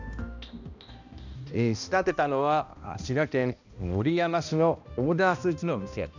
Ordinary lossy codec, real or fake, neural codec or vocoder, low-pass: none; fake; codec, 16 kHz, 1 kbps, X-Codec, HuBERT features, trained on balanced general audio; 7.2 kHz